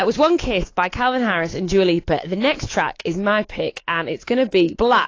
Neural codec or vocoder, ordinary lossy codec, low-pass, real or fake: codec, 24 kHz, 3.1 kbps, DualCodec; AAC, 32 kbps; 7.2 kHz; fake